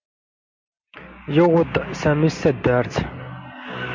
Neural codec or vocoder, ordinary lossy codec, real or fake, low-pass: none; MP3, 48 kbps; real; 7.2 kHz